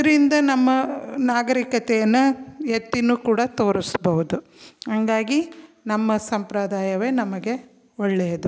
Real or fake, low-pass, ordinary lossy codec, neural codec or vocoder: real; none; none; none